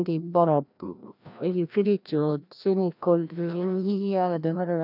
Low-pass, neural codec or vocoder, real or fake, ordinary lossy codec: 5.4 kHz; codec, 16 kHz, 1 kbps, FreqCodec, larger model; fake; none